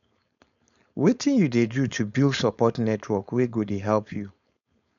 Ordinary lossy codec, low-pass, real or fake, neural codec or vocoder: none; 7.2 kHz; fake; codec, 16 kHz, 4.8 kbps, FACodec